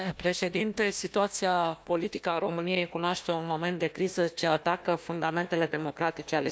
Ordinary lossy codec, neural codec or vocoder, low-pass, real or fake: none; codec, 16 kHz, 1 kbps, FunCodec, trained on Chinese and English, 50 frames a second; none; fake